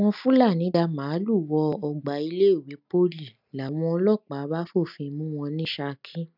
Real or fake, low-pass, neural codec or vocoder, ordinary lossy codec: real; 5.4 kHz; none; none